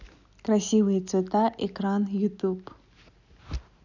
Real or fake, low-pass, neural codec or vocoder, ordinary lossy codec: real; 7.2 kHz; none; none